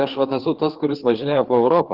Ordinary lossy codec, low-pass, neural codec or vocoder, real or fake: Opus, 16 kbps; 5.4 kHz; codec, 16 kHz, 4 kbps, FreqCodec, larger model; fake